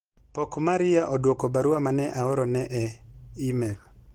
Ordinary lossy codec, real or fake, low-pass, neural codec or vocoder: Opus, 16 kbps; real; 19.8 kHz; none